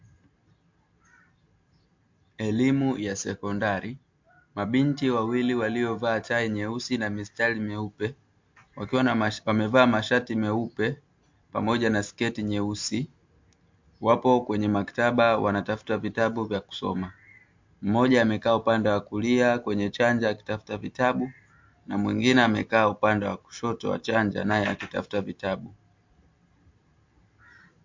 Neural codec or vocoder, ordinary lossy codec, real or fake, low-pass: none; MP3, 48 kbps; real; 7.2 kHz